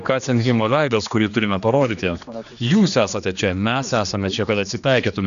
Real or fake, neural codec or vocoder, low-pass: fake; codec, 16 kHz, 2 kbps, X-Codec, HuBERT features, trained on general audio; 7.2 kHz